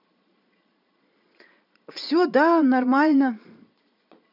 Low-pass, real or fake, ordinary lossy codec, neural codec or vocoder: 5.4 kHz; fake; none; vocoder, 22.05 kHz, 80 mel bands, Vocos